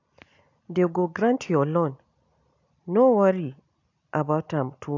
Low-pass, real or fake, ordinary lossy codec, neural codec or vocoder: 7.2 kHz; real; AAC, 48 kbps; none